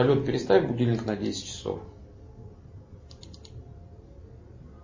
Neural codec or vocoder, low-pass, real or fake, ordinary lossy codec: none; 7.2 kHz; real; MP3, 32 kbps